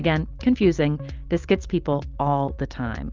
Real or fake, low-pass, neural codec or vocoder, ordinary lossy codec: real; 7.2 kHz; none; Opus, 24 kbps